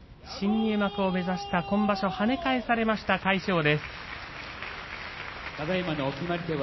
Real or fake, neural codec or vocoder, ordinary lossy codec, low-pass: real; none; MP3, 24 kbps; 7.2 kHz